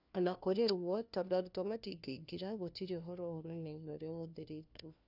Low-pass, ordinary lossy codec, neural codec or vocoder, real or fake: 5.4 kHz; none; codec, 16 kHz, 1 kbps, FunCodec, trained on LibriTTS, 50 frames a second; fake